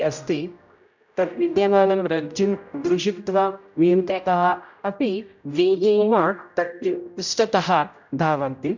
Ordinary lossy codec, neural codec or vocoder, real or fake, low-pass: none; codec, 16 kHz, 0.5 kbps, X-Codec, HuBERT features, trained on general audio; fake; 7.2 kHz